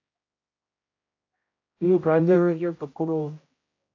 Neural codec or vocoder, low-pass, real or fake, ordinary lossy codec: codec, 16 kHz, 0.5 kbps, X-Codec, HuBERT features, trained on general audio; 7.2 kHz; fake; AAC, 32 kbps